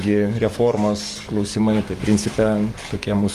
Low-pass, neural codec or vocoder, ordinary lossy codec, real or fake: 14.4 kHz; codec, 44.1 kHz, 7.8 kbps, DAC; Opus, 32 kbps; fake